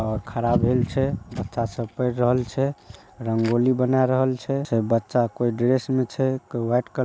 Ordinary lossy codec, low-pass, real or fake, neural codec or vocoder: none; none; real; none